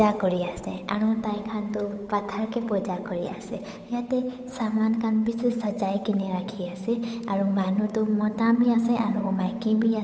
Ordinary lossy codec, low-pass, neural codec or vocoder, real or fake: none; none; codec, 16 kHz, 8 kbps, FunCodec, trained on Chinese and English, 25 frames a second; fake